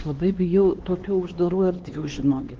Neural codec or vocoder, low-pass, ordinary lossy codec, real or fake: codec, 16 kHz, 2 kbps, X-Codec, HuBERT features, trained on LibriSpeech; 7.2 kHz; Opus, 16 kbps; fake